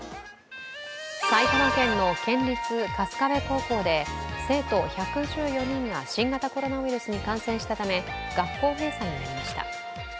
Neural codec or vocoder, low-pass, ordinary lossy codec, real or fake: none; none; none; real